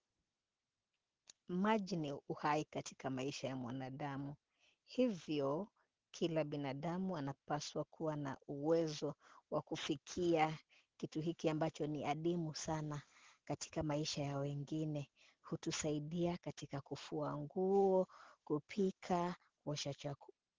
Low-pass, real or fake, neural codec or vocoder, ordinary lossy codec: 7.2 kHz; real; none; Opus, 16 kbps